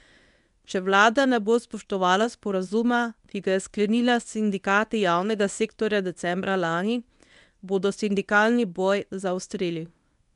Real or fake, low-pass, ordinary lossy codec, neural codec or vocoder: fake; 10.8 kHz; none; codec, 24 kHz, 0.9 kbps, WavTokenizer, medium speech release version 1